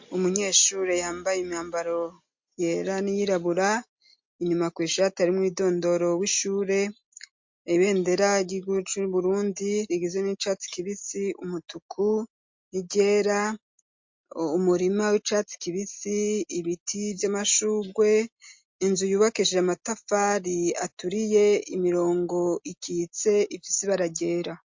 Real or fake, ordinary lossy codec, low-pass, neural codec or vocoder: real; MP3, 48 kbps; 7.2 kHz; none